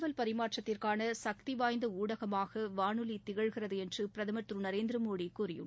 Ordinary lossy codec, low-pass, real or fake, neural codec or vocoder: none; none; real; none